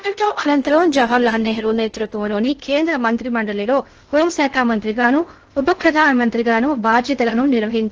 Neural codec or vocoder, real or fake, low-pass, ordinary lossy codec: codec, 16 kHz in and 24 kHz out, 0.6 kbps, FocalCodec, streaming, 2048 codes; fake; 7.2 kHz; Opus, 16 kbps